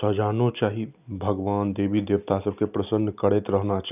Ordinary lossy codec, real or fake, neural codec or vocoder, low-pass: none; real; none; 3.6 kHz